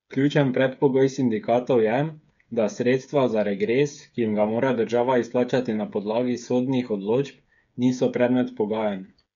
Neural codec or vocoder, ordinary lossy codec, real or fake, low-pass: codec, 16 kHz, 8 kbps, FreqCodec, smaller model; MP3, 64 kbps; fake; 7.2 kHz